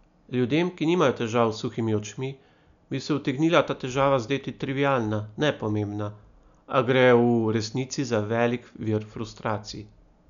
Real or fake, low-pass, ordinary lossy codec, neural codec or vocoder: real; 7.2 kHz; none; none